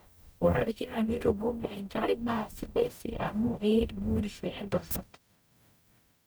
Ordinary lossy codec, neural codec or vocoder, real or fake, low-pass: none; codec, 44.1 kHz, 0.9 kbps, DAC; fake; none